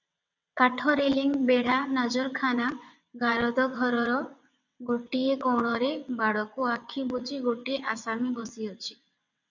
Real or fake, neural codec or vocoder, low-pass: fake; vocoder, 22.05 kHz, 80 mel bands, WaveNeXt; 7.2 kHz